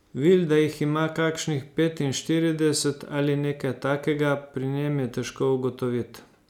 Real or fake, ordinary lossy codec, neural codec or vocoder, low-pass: real; none; none; 19.8 kHz